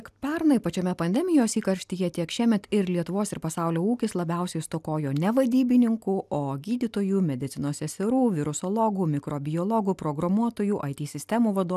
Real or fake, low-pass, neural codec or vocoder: real; 14.4 kHz; none